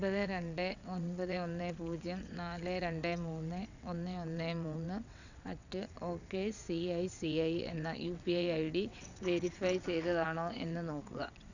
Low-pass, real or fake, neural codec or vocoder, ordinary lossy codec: 7.2 kHz; fake; vocoder, 22.05 kHz, 80 mel bands, WaveNeXt; none